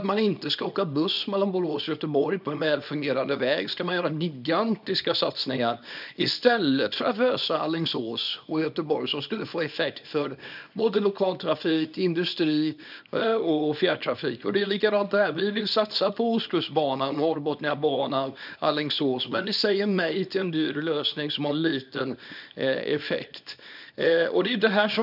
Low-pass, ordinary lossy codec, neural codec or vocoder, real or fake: 5.4 kHz; none; codec, 24 kHz, 0.9 kbps, WavTokenizer, small release; fake